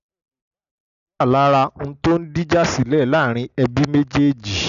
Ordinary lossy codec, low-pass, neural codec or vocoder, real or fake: none; 7.2 kHz; none; real